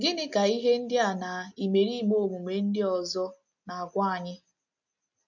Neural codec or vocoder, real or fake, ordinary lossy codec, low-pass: none; real; none; 7.2 kHz